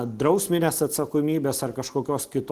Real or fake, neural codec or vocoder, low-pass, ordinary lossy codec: real; none; 14.4 kHz; Opus, 16 kbps